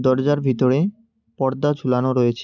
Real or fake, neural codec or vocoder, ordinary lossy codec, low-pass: real; none; none; 7.2 kHz